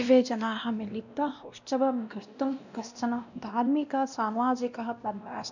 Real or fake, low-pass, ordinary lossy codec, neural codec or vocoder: fake; 7.2 kHz; none; codec, 16 kHz, 1 kbps, X-Codec, WavLM features, trained on Multilingual LibriSpeech